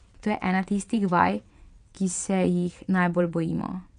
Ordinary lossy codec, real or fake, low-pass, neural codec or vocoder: none; fake; 9.9 kHz; vocoder, 22.05 kHz, 80 mel bands, WaveNeXt